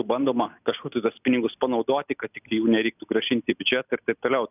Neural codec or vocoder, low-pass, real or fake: none; 3.6 kHz; real